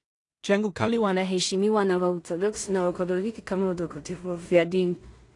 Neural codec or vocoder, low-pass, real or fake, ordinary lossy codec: codec, 16 kHz in and 24 kHz out, 0.4 kbps, LongCat-Audio-Codec, two codebook decoder; 10.8 kHz; fake; AAC, 48 kbps